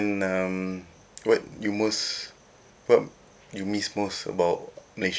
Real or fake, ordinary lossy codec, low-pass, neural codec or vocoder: real; none; none; none